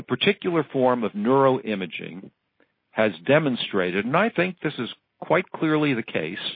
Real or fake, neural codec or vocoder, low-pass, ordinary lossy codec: real; none; 5.4 kHz; MP3, 24 kbps